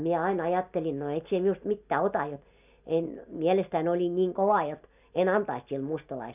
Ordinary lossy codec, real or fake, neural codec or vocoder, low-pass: none; real; none; 3.6 kHz